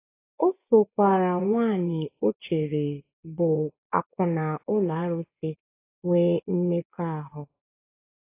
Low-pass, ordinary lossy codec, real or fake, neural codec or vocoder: 3.6 kHz; AAC, 24 kbps; fake; vocoder, 24 kHz, 100 mel bands, Vocos